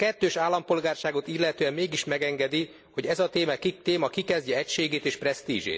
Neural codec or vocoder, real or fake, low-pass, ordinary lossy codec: none; real; none; none